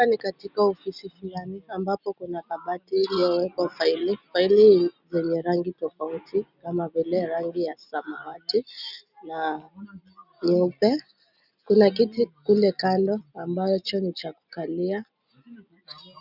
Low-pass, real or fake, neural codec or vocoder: 5.4 kHz; real; none